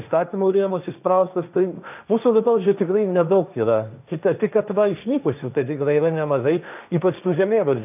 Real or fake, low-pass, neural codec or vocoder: fake; 3.6 kHz; codec, 16 kHz, 1.1 kbps, Voila-Tokenizer